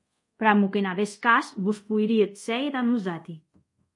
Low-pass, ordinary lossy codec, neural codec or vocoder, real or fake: 10.8 kHz; MP3, 48 kbps; codec, 24 kHz, 0.5 kbps, DualCodec; fake